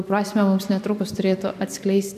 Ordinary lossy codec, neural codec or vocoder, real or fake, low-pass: AAC, 96 kbps; vocoder, 44.1 kHz, 128 mel bands every 256 samples, BigVGAN v2; fake; 14.4 kHz